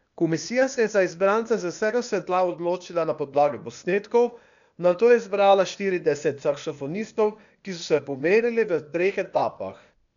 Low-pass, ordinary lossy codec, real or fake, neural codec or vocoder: 7.2 kHz; none; fake; codec, 16 kHz, 0.8 kbps, ZipCodec